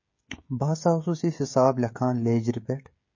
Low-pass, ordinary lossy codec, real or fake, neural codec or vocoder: 7.2 kHz; MP3, 32 kbps; fake; codec, 16 kHz, 16 kbps, FreqCodec, smaller model